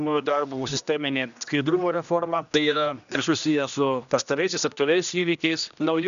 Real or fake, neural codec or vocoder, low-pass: fake; codec, 16 kHz, 1 kbps, X-Codec, HuBERT features, trained on general audio; 7.2 kHz